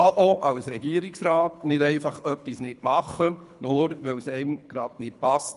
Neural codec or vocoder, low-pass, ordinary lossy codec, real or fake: codec, 24 kHz, 3 kbps, HILCodec; 10.8 kHz; none; fake